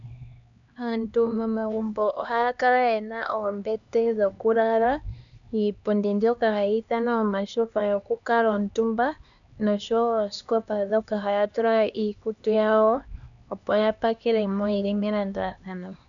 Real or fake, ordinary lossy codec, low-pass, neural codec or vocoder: fake; MP3, 96 kbps; 7.2 kHz; codec, 16 kHz, 2 kbps, X-Codec, HuBERT features, trained on LibriSpeech